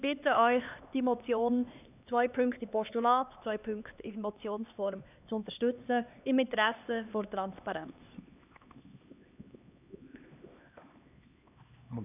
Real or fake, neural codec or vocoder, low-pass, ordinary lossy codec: fake; codec, 16 kHz, 2 kbps, X-Codec, HuBERT features, trained on LibriSpeech; 3.6 kHz; none